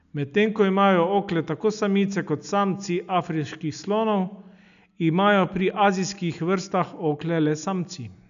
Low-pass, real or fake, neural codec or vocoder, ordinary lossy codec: 7.2 kHz; real; none; none